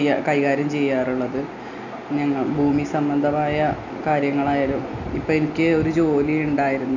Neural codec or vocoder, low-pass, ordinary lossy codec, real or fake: none; 7.2 kHz; none; real